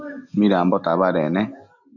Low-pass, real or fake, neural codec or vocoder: 7.2 kHz; real; none